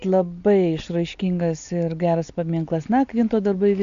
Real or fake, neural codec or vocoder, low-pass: real; none; 7.2 kHz